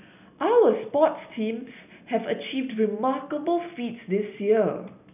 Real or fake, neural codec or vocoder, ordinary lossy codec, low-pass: real; none; none; 3.6 kHz